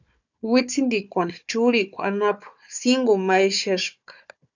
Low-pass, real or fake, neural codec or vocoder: 7.2 kHz; fake; codec, 16 kHz, 4 kbps, FunCodec, trained on Chinese and English, 50 frames a second